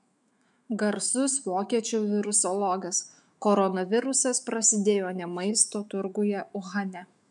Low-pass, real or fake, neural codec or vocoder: 10.8 kHz; fake; autoencoder, 48 kHz, 128 numbers a frame, DAC-VAE, trained on Japanese speech